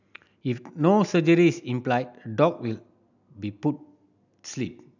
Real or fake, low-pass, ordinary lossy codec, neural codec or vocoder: real; 7.2 kHz; none; none